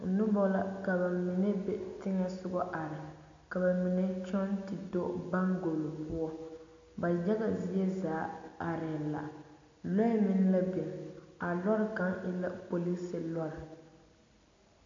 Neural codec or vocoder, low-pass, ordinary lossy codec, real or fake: none; 7.2 kHz; AAC, 64 kbps; real